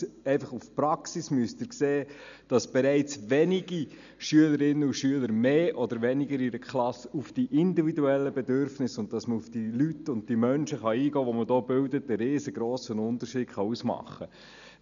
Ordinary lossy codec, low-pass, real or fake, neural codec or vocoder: none; 7.2 kHz; real; none